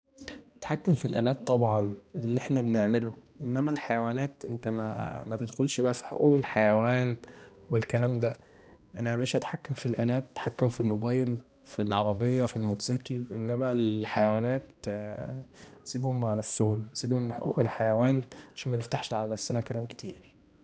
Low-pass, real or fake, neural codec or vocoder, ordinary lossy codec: none; fake; codec, 16 kHz, 1 kbps, X-Codec, HuBERT features, trained on balanced general audio; none